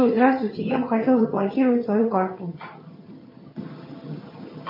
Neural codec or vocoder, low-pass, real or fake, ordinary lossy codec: vocoder, 22.05 kHz, 80 mel bands, HiFi-GAN; 5.4 kHz; fake; MP3, 24 kbps